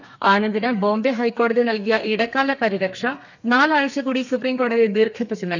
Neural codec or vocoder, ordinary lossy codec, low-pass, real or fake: codec, 32 kHz, 1.9 kbps, SNAC; none; 7.2 kHz; fake